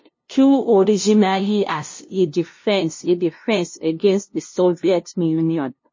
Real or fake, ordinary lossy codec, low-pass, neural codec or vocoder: fake; MP3, 32 kbps; 7.2 kHz; codec, 16 kHz, 0.5 kbps, FunCodec, trained on LibriTTS, 25 frames a second